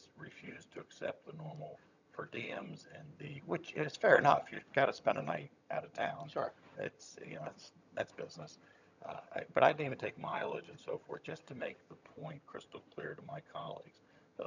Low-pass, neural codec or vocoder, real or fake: 7.2 kHz; vocoder, 22.05 kHz, 80 mel bands, HiFi-GAN; fake